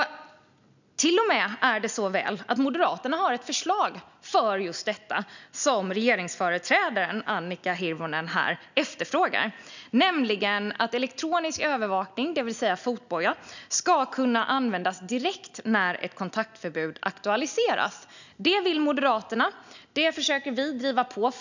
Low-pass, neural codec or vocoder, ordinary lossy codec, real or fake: 7.2 kHz; none; none; real